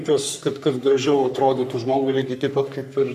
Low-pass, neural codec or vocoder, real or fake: 14.4 kHz; codec, 44.1 kHz, 3.4 kbps, Pupu-Codec; fake